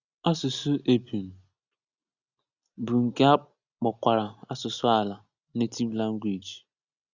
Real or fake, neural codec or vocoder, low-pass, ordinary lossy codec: real; none; 7.2 kHz; Opus, 64 kbps